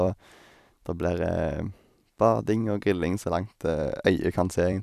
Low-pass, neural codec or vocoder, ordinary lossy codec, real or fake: 14.4 kHz; vocoder, 44.1 kHz, 128 mel bands every 256 samples, BigVGAN v2; none; fake